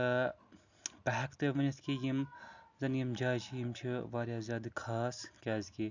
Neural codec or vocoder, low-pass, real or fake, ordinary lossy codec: none; 7.2 kHz; real; none